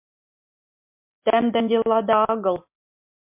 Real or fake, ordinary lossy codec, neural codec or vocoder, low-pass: real; MP3, 32 kbps; none; 3.6 kHz